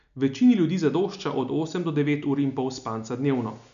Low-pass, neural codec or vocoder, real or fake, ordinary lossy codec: 7.2 kHz; none; real; none